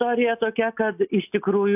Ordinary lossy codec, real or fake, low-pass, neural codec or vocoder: AAC, 32 kbps; real; 3.6 kHz; none